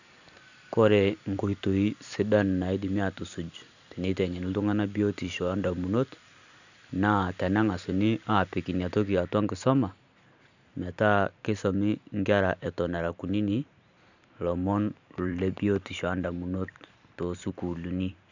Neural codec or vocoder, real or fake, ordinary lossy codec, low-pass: vocoder, 44.1 kHz, 128 mel bands every 512 samples, BigVGAN v2; fake; none; 7.2 kHz